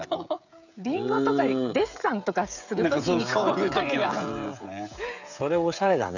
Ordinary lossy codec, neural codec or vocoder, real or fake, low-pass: none; codec, 16 kHz, 16 kbps, FreqCodec, smaller model; fake; 7.2 kHz